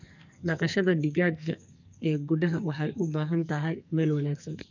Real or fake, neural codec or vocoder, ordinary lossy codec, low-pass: fake; codec, 44.1 kHz, 2.6 kbps, SNAC; none; 7.2 kHz